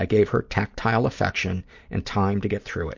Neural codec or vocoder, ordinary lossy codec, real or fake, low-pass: vocoder, 44.1 kHz, 128 mel bands every 256 samples, BigVGAN v2; MP3, 48 kbps; fake; 7.2 kHz